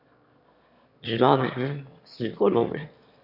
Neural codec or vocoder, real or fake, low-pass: autoencoder, 22.05 kHz, a latent of 192 numbers a frame, VITS, trained on one speaker; fake; 5.4 kHz